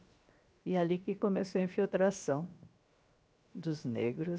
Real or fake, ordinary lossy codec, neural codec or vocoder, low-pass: fake; none; codec, 16 kHz, 0.7 kbps, FocalCodec; none